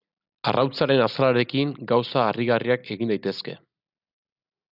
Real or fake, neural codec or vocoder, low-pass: real; none; 5.4 kHz